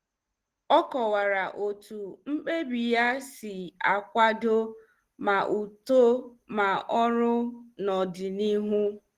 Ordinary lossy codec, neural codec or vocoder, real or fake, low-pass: Opus, 16 kbps; none; real; 14.4 kHz